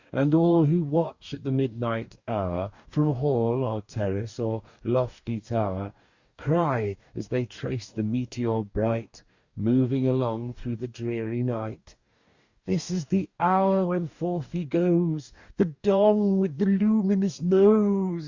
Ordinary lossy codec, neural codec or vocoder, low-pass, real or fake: Opus, 64 kbps; codec, 44.1 kHz, 2.6 kbps, DAC; 7.2 kHz; fake